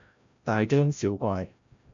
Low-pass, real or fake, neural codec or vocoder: 7.2 kHz; fake; codec, 16 kHz, 0.5 kbps, FreqCodec, larger model